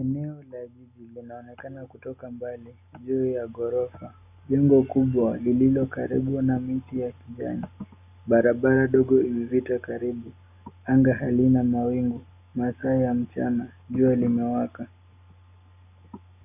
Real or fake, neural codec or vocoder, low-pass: real; none; 3.6 kHz